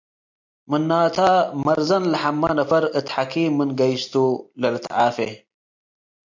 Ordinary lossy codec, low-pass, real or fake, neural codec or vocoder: MP3, 64 kbps; 7.2 kHz; real; none